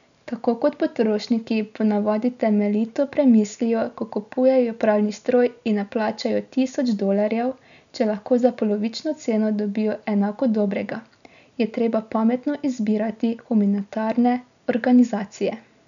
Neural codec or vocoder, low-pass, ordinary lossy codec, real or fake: none; 7.2 kHz; none; real